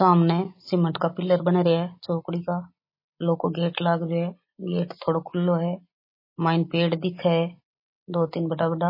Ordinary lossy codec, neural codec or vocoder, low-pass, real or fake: MP3, 24 kbps; none; 5.4 kHz; real